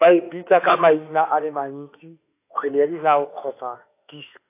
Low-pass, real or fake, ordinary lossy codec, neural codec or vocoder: 3.6 kHz; fake; AAC, 24 kbps; autoencoder, 48 kHz, 32 numbers a frame, DAC-VAE, trained on Japanese speech